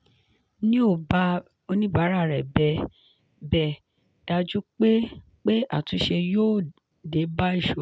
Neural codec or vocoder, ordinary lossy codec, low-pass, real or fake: none; none; none; real